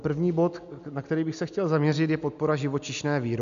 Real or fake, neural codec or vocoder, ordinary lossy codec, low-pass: real; none; MP3, 64 kbps; 7.2 kHz